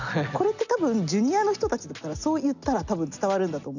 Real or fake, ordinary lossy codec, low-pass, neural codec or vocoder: real; none; 7.2 kHz; none